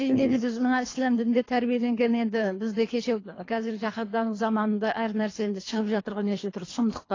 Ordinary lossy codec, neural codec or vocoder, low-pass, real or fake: AAC, 32 kbps; codec, 24 kHz, 3 kbps, HILCodec; 7.2 kHz; fake